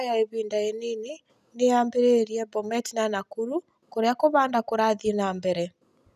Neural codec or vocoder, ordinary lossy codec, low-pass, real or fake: none; none; 14.4 kHz; real